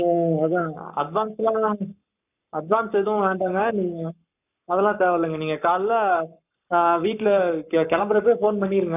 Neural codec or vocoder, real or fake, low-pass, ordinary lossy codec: codec, 44.1 kHz, 7.8 kbps, Pupu-Codec; fake; 3.6 kHz; none